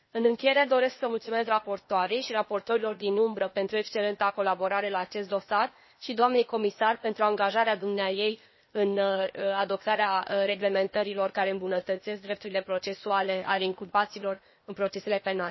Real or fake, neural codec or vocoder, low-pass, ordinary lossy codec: fake; codec, 16 kHz, 0.8 kbps, ZipCodec; 7.2 kHz; MP3, 24 kbps